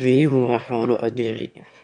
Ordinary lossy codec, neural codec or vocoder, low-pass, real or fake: none; autoencoder, 22.05 kHz, a latent of 192 numbers a frame, VITS, trained on one speaker; 9.9 kHz; fake